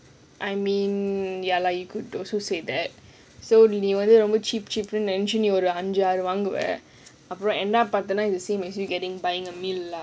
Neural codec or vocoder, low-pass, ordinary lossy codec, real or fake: none; none; none; real